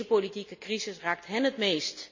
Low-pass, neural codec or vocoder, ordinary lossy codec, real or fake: 7.2 kHz; none; MP3, 64 kbps; real